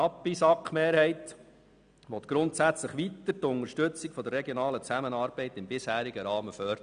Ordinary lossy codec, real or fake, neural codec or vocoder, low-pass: none; real; none; 9.9 kHz